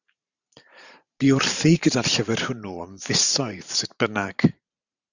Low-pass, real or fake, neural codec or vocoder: 7.2 kHz; fake; vocoder, 44.1 kHz, 128 mel bands every 512 samples, BigVGAN v2